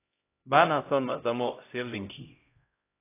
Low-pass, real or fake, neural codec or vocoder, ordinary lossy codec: 3.6 kHz; fake; codec, 16 kHz, 0.5 kbps, X-Codec, HuBERT features, trained on LibriSpeech; AAC, 24 kbps